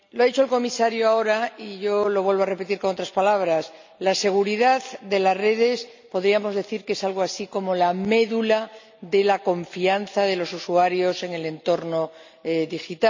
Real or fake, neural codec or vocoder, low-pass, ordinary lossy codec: real; none; 7.2 kHz; MP3, 64 kbps